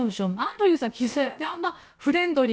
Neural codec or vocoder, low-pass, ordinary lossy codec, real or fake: codec, 16 kHz, about 1 kbps, DyCAST, with the encoder's durations; none; none; fake